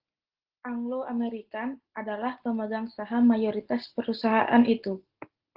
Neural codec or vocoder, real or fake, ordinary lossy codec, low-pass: none; real; Opus, 16 kbps; 5.4 kHz